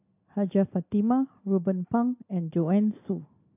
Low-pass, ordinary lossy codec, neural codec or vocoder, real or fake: 3.6 kHz; AAC, 32 kbps; none; real